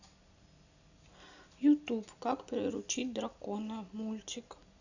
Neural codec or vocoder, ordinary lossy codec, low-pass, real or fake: none; none; 7.2 kHz; real